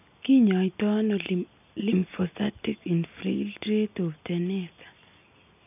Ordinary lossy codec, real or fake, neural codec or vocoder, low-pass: none; real; none; 3.6 kHz